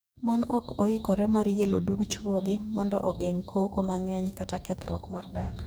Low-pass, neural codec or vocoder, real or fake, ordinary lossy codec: none; codec, 44.1 kHz, 2.6 kbps, DAC; fake; none